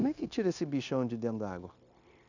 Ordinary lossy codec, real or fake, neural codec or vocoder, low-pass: none; fake; codec, 16 kHz, 0.9 kbps, LongCat-Audio-Codec; 7.2 kHz